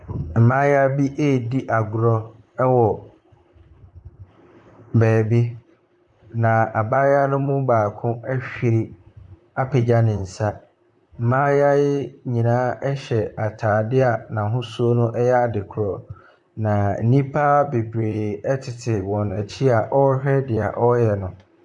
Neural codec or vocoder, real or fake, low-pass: vocoder, 44.1 kHz, 128 mel bands, Pupu-Vocoder; fake; 10.8 kHz